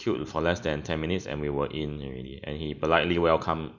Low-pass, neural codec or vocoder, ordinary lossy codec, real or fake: 7.2 kHz; none; none; real